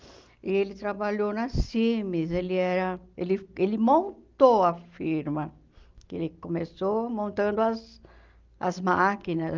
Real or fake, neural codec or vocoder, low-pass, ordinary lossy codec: real; none; 7.2 kHz; Opus, 32 kbps